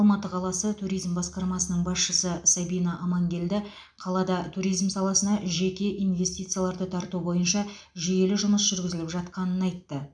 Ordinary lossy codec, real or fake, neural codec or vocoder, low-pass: none; real; none; 9.9 kHz